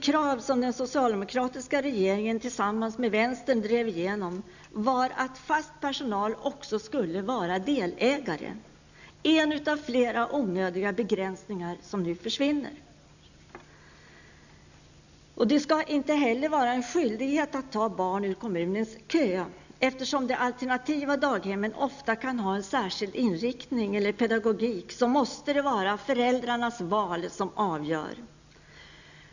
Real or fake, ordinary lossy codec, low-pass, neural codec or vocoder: real; none; 7.2 kHz; none